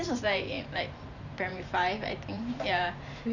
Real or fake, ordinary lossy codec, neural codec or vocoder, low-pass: fake; none; vocoder, 44.1 kHz, 128 mel bands every 512 samples, BigVGAN v2; 7.2 kHz